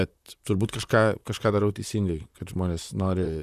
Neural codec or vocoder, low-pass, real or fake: vocoder, 44.1 kHz, 128 mel bands, Pupu-Vocoder; 14.4 kHz; fake